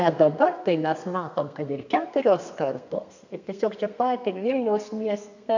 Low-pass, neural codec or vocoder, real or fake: 7.2 kHz; codec, 32 kHz, 1.9 kbps, SNAC; fake